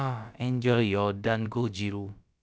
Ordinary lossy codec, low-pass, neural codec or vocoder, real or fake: none; none; codec, 16 kHz, about 1 kbps, DyCAST, with the encoder's durations; fake